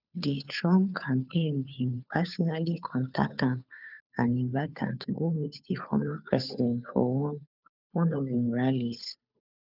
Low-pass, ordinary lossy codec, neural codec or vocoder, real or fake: 5.4 kHz; none; codec, 16 kHz, 2 kbps, FunCodec, trained on Chinese and English, 25 frames a second; fake